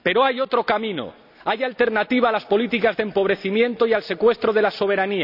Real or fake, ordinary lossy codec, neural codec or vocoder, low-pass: real; none; none; 5.4 kHz